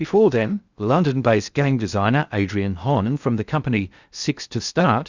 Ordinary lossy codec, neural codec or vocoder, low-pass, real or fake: Opus, 64 kbps; codec, 16 kHz in and 24 kHz out, 0.6 kbps, FocalCodec, streaming, 2048 codes; 7.2 kHz; fake